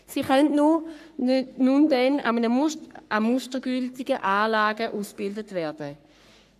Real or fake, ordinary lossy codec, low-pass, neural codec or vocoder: fake; AAC, 96 kbps; 14.4 kHz; codec, 44.1 kHz, 3.4 kbps, Pupu-Codec